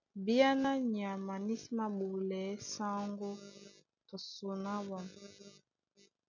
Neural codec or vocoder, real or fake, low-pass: none; real; 7.2 kHz